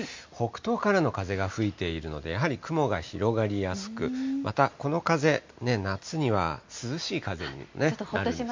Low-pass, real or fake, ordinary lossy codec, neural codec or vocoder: 7.2 kHz; real; MP3, 48 kbps; none